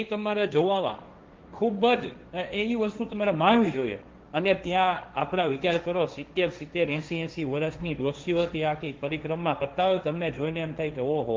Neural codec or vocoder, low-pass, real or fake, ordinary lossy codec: codec, 16 kHz, 1.1 kbps, Voila-Tokenizer; 7.2 kHz; fake; Opus, 32 kbps